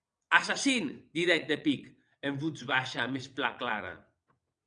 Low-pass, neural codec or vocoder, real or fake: 9.9 kHz; vocoder, 22.05 kHz, 80 mel bands, WaveNeXt; fake